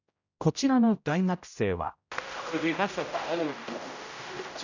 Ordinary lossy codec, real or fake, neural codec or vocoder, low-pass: none; fake; codec, 16 kHz, 0.5 kbps, X-Codec, HuBERT features, trained on general audio; 7.2 kHz